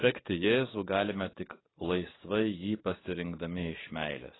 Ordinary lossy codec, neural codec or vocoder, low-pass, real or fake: AAC, 16 kbps; codec, 44.1 kHz, 7.8 kbps, DAC; 7.2 kHz; fake